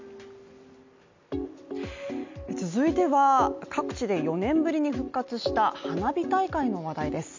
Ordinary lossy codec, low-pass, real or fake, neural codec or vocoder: MP3, 64 kbps; 7.2 kHz; real; none